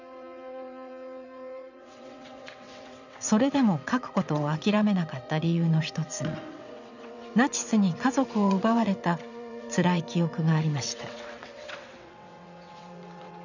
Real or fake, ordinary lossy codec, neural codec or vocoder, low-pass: fake; none; vocoder, 22.05 kHz, 80 mel bands, WaveNeXt; 7.2 kHz